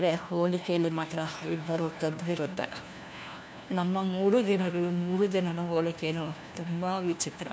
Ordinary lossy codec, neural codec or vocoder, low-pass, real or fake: none; codec, 16 kHz, 1 kbps, FunCodec, trained on LibriTTS, 50 frames a second; none; fake